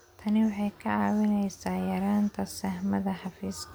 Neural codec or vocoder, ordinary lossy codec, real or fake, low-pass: none; none; real; none